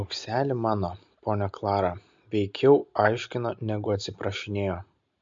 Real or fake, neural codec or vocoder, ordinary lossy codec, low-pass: real; none; MP3, 48 kbps; 7.2 kHz